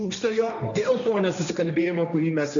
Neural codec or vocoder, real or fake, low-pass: codec, 16 kHz, 1.1 kbps, Voila-Tokenizer; fake; 7.2 kHz